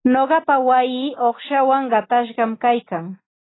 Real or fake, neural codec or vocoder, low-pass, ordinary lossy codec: real; none; 7.2 kHz; AAC, 16 kbps